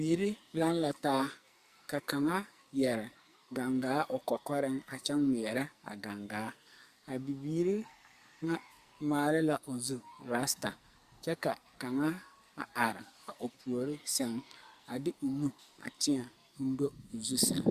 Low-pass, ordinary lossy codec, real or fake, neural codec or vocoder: 14.4 kHz; Opus, 64 kbps; fake; codec, 44.1 kHz, 2.6 kbps, SNAC